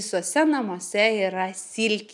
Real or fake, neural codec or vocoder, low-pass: real; none; 10.8 kHz